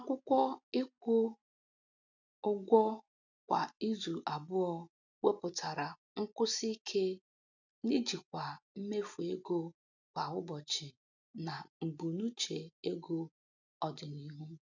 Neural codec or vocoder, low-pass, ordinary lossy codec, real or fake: none; 7.2 kHz; none; real